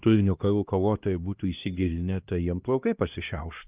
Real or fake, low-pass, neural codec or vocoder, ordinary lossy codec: fake; 3.6 kHz; codec, 16 kHz, 1 kbps, X-Codec, HuBERT features, trained on LibriSpeech; Opus, 32 kbps